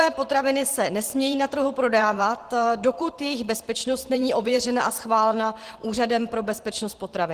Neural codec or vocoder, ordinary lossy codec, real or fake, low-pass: vocoder, 44.1 kHz, 128 mel bands every 512 samples, BigVGAN v2; Opus, 16 kbps; fake; 14.4 kHz